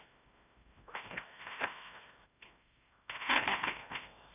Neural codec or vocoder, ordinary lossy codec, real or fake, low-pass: codec, 16 kHz, 1 kbps, FreqCodec, larger model; none; fake; 3.6 kHz